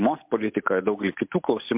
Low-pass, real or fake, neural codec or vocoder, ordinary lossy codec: 3.6 kHz; real; none; MP3, 32 kbps